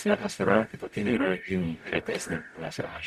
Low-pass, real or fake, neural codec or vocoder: 14.4 kHz; fake; codec, 44.1 kHz, 0.9 kbps, DAC